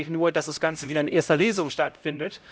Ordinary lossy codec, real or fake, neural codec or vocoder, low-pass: none; fake; codec, 16 kHz, 0.5 kbps, X-Codec, HuBERT features, trained on LibriSpeech; none